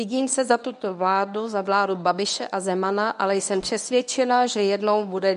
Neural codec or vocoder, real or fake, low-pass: codec, 24 kHz, 0.9 kbps, WavTokenizer, medium speech release version 1; fake; 10.8 kHz